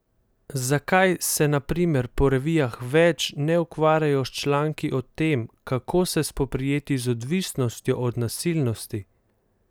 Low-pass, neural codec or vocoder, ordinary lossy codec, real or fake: none; none; none; real